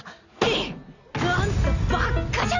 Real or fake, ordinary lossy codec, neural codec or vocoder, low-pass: real; none; none; 7.2 kHz